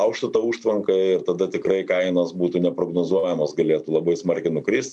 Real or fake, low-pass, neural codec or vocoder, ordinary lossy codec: real; 10.8 kHz; none; MP3, 96 kbps